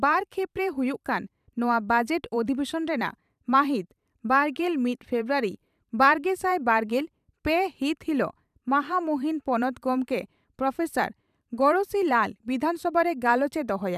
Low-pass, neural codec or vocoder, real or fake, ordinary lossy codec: 14.4 kHz; vocoder, 44.1 kHz, 128 mel bands every 512 samples, BigVGAN v2; fake; none